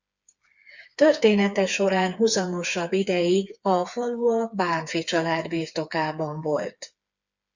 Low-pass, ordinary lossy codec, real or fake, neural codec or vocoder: 7.2 kHz; Opus, 64 kbps; fake; codec, 16 kHz, 4 kbps, FreqCodec, smaller model